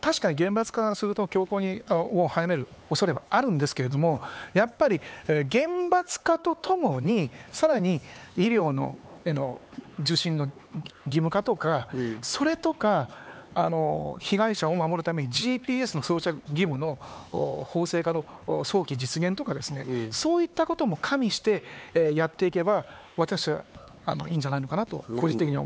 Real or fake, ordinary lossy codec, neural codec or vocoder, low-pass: fake; none; codec, 16 kHz, 4 kbps, X-Codec, HuBERT features, trained on LibriSpeech; none